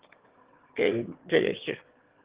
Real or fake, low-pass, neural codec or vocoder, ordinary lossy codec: fake; 3.6 kHz; autoencoder, 22.05 kHz, a latent of 192 numbers a frame, VITS, trained on one speaker; Opus, 16 kbps